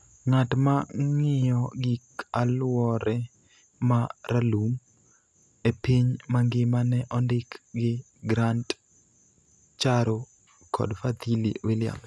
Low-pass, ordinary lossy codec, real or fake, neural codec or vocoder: none; none; real; none